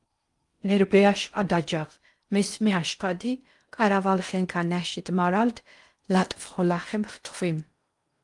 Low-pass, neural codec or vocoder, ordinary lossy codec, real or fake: 10.8 kHz; codec, 16 kHz in and 24 kHz out, 0.6 kbps, FocalCodec, streaming, 4096 codes; Opus, 32 kbps; fake